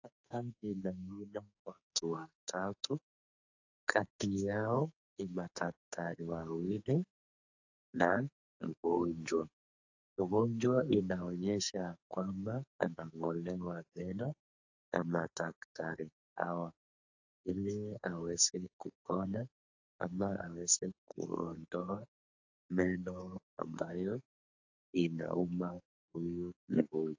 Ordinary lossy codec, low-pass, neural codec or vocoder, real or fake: AAC, 48 kbps; 7.2 kHz; codec, 44.1 kHz, 2.6 kbps, SNAC; fake